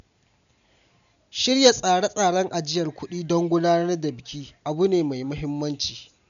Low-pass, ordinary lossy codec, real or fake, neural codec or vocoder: 7.2 kHz; none; real; none